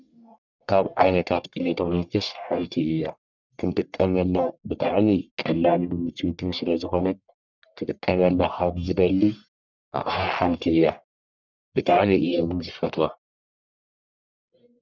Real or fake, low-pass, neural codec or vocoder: fake; 7.2 kHz; codec, 44.1 kHz, 1.7 kbps, Pupu-Codec